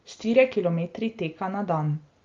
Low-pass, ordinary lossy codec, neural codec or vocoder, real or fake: 7.2 kHz; Opus, 32 kbps; none; real